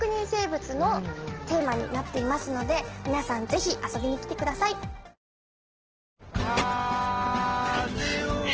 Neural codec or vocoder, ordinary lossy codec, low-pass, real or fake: none; Opus, 16 kbps; 7.2 kHz; real